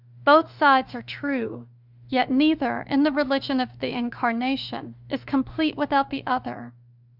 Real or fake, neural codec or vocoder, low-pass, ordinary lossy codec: fake; autoencoder, 48 kHz, 32 numbers a frame, DAC-VAE, trained on Japanese speech; 5.4 kHz; Opus, 64 kbps